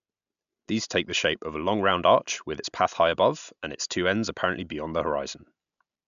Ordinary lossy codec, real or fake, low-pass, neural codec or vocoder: MP3, 96 kbps; real; 7.2 kHz; none